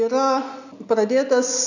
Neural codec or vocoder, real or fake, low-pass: none; real; 7.2 kHz